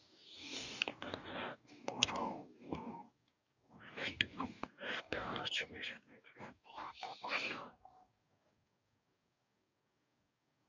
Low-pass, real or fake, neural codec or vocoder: 7.2 kHz; fake; codec, 44.1 kHz, 2.6 kbps, DAC